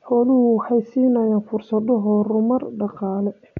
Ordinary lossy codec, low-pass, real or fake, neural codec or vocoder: none; 7.2 kHz; real; none